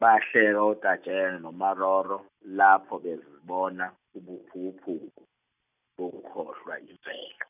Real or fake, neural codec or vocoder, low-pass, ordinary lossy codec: real; none; 3.6 kHz; none